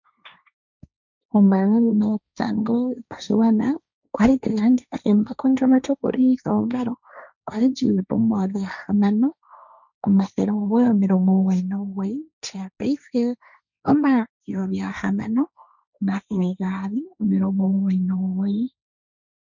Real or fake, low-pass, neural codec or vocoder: fake; 7.2 kHz; codec, 16 kHz, 1.1 kbps, Voila-Tokenizer